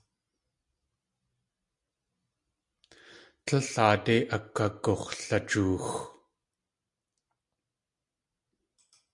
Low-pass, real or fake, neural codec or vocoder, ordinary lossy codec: 10.8 kHz; real; none; MP3, 64 kbps